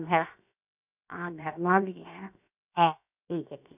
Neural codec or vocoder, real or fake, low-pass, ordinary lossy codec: codec, 16 kHz, 0.7 kbps, FocalCodec; fake; 3.6 kHz; none